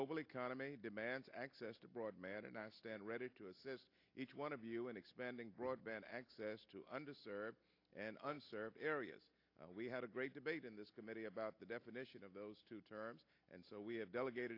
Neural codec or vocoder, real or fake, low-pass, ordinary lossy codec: none; real; 5.4 kHz; AAC, 32 kbps